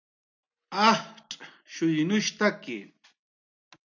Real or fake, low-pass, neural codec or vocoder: real; 7.2 kHz; none